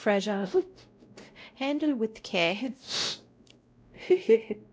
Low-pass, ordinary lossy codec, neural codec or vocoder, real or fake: none; none; codec, 16 kHz, 0.5 kbps, X-Codec, WavLM features, trained on Multilingual LibriSpeech; fake